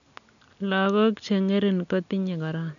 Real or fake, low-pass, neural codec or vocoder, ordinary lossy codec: real; 7.2 kHz; none; none